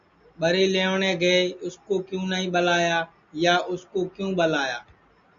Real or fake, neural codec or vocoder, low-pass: real; none; 7.2 kHz